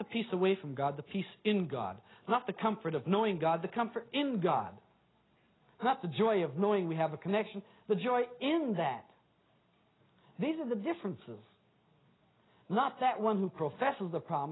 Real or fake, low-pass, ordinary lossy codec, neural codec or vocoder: real; 7.2 kHz; AAC, 16 kbps; none